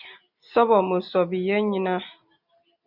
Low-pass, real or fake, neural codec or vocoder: 5.4 kHz; real; none